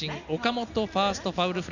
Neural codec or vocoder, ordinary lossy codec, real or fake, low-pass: none; none; real; 7.2 kHz